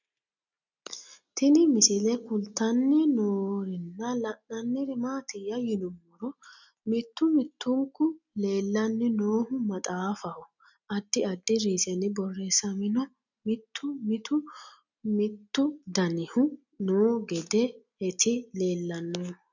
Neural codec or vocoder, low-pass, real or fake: none; 7.2 kHz; real